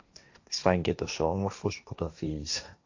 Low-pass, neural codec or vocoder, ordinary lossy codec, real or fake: 7.2 kHz; codec, 16 kHz, 1.1 kbps, Voila-Tokenizer; AAC, 48 kbps; fake